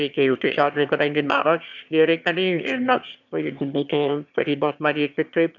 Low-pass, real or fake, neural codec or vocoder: 7.2 kHz; fake; autoencoder, 22.05 kHz, a latent of 192 numbers a frame, VITS, trained on one speaker